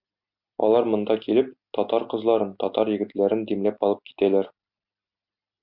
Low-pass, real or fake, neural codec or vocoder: 5.4 kHz; real; none